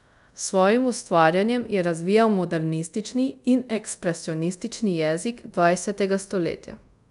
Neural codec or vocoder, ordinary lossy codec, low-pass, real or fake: codec, 24 kHz, 0.5 kbps, DualCodec; none; 10.8 kHz; fake